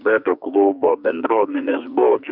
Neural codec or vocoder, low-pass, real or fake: codec, 44.1 kHz, 2.6 kbps, SNAC; 5.4 kHz; fake